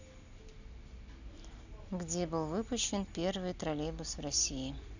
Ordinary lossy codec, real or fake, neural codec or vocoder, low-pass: none; real; none; 7.2 kHz